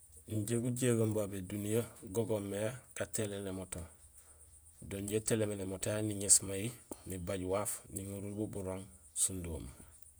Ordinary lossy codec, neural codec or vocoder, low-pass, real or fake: none; vocoder, 48 kHz, 128 mel bands, Vocos; none; fake